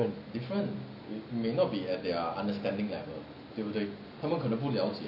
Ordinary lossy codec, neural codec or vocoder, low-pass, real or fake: none; none; 5.4 kHz; real